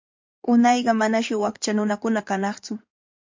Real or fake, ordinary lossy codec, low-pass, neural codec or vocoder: fake; MP3, 48 kbps; 7.2 kHz; codec, 16 kHz in and 24 kHz out, 2.2 kbps, FireRedTTS-2 codec